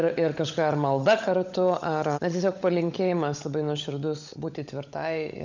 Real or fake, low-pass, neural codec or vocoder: fake; 7.2 kHz; codec, 16 kHz, 16 kbps, FunCodec, trained on LibriTTS, 50 frames a second